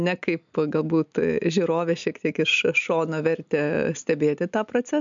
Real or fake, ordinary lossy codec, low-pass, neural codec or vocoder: real; MP3, 64 kbps; 7.2 kHz; none